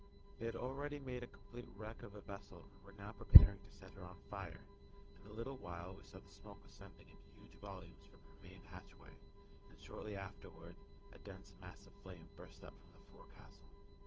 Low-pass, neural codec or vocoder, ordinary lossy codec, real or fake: 7.2 kHz; codec, 16 kHz, 8 kbps, FunCodec, trained on Chinese and English, 25 frames a second; Opus, 24 kbps; fake